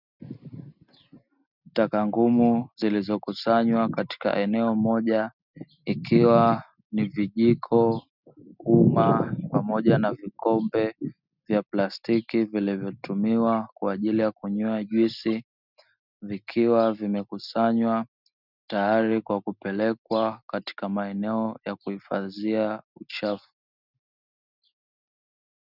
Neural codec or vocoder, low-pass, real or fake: none; 5.4 kHz; real